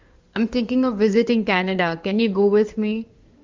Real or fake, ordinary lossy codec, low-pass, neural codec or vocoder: fake; Opus, 32 kbps; 7.2 kHz; codec, 16 kHz in and 24 kHz out, 2.2 kbps, FireRedTTS-2 codec